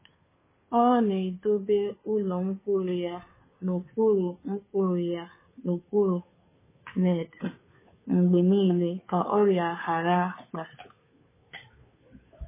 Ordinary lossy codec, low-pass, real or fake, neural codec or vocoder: MP3, 16 kbps; 3.6 kHz; fake; codec, 16 kHz, 2 kbps, FunCodec, trained on Chinese and English, 25 frames a second